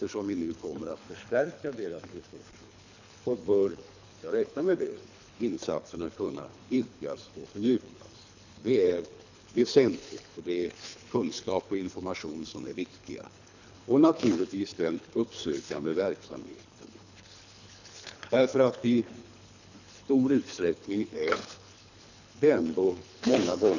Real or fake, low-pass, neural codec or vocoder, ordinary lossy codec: fake; 7.2 kHz; codec, 24 kHz, 3 kbps, HILCodec; none